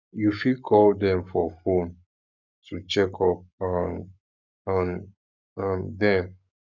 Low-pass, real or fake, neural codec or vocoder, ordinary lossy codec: 7.2 kHz; fake; codec, 44.1 kHz, 7.8 kbps, Pupu-Codec; none